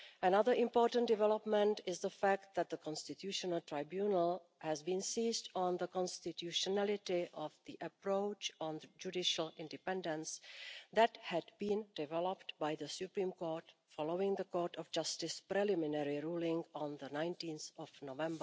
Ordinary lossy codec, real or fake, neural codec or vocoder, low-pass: none; real; none; none